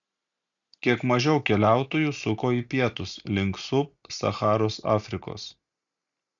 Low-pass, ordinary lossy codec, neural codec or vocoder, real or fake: 7.2 kHz; MP3, 96 kbps; none; real